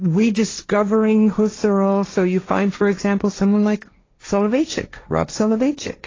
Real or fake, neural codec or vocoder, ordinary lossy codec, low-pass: fake; codec, 16 kHz, 1.1 kbps, Voila-Tokenizer; AAC, 32 kbps; 7.2 kHz